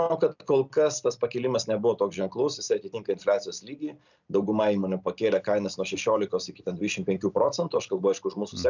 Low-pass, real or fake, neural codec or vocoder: 7.2 kHz; real; none